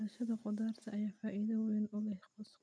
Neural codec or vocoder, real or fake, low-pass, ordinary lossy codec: none; real; none; none